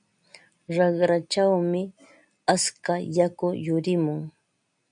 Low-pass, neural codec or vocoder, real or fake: 9.9 kHz; none; real